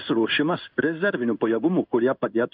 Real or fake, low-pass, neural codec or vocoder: fake; 5.4 kHz; codec, 16 kHz in and 24 kHz out, 1 kbps, XY-Tokenizer